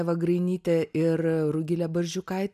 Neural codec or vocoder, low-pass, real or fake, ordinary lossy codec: none; 14.4 kHz; real; MP3, 96 kbps